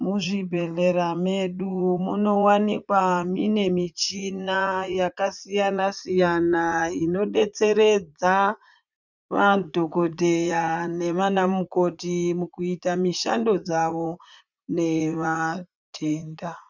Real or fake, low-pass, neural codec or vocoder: fake; 7.2 kHz; vocoder, 44.1 kHz, 80 mel bands, Vocos